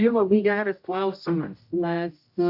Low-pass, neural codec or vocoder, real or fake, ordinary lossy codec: 5.4 kHz; codec, 16 kHz, 0.5 kbps, X-Codec, HuBERT features, trained on general audio; fake; AAC, 48 kbps